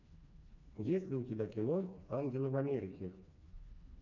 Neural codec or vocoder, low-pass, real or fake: codec, 16 kHz, 2 kbps, FreqCodec, smaller model; 7.2 kHz; fake